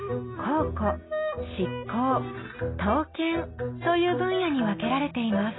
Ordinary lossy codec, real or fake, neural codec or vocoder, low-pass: AAC, 16 kbps; real; none; 7.2 kHz